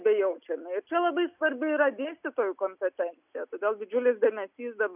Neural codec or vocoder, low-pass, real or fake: none; 3.6 kHz; real